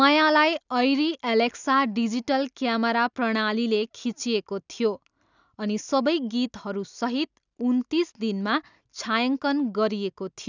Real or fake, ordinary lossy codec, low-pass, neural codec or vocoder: real; none; 7.2 kHz; none